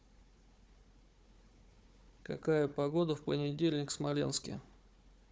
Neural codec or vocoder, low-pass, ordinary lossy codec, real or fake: codec, 16 kHz, 4 kbps, FunCodec, trained on Chinese and English, 50 frames a second; none; none; fake